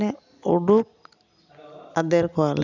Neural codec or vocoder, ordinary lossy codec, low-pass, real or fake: none; none; 7.2 kHz; real